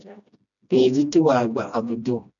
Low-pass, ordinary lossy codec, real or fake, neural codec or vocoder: 7.2 kHz; MP3, 96 kbps; fake; codec, 16 kHz, 1 kbps, FreqCodec, smaller model